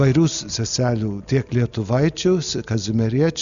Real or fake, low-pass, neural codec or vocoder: real; 7.2 kHz; none